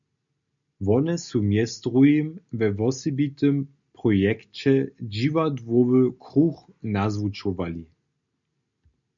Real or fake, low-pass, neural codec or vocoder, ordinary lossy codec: real; 7.2 kHz; none; MP3, 96 kbps